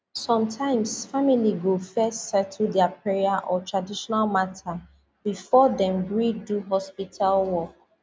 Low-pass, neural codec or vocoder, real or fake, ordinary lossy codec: none; none; real; none